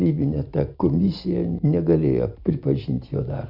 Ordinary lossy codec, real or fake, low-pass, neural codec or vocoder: Opus, 64 kbps; real; 5.4 kHz; none